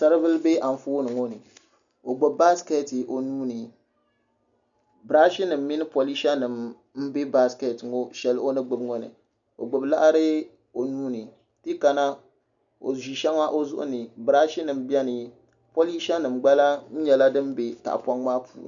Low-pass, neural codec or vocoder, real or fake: 7.2 kHz; none; real